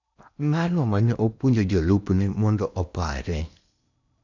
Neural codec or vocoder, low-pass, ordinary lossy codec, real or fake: codec, 16 kHz in and 24 kHz out, 0.8 kbps, FocalCodec, streaming, 65536 codes; 7.2 kHz; none; fake